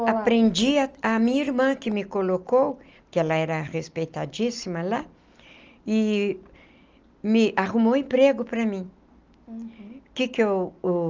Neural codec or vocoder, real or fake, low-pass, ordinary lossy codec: none; real; 7.2 kHz; Opus, 24 kbps